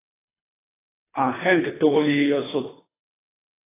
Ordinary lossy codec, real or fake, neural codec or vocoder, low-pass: AAC, 16 kbps; fake; codec, 24 kHz, 3 kbps, HILCodec; 3.6 kHz